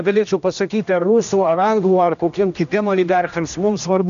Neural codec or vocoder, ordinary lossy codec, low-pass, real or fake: codec, 16 kHz, 1 kbps, X-Codec, HuBERT features, trained on general audio; MP3, 96 kbps; 7.2 kHz; fake